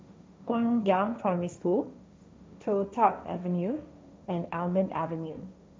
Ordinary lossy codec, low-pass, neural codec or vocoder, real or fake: none; none; codec, 16 kHz, 1.1 kbps, Voila-Tokenizer; fake